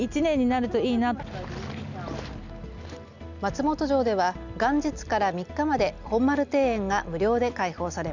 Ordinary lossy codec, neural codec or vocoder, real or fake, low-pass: none; none; real; 7.2 kHz